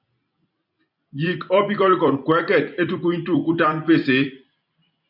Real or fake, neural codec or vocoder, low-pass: fake; vocoder, 44.1 kHz, 128 mel bands every 256 samples, BigVGAN v2; 5.4 kHz